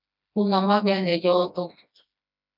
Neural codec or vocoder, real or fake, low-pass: codec, 16 kHz, 1 kbps, FreqCodec, smaller model; fake; 5.4 kHz